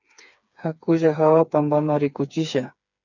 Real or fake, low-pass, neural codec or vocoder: fake; 7.2 kHz; codec, 16 kHz, 4 kbps, FreqCodec, smaller model